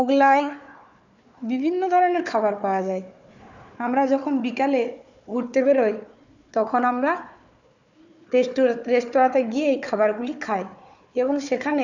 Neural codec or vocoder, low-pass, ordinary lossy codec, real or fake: codec, 16 kHz, 4 kbps, FunCodec, trained on Chinese and English, 50 frames a second; 7.2 kHz; none; fake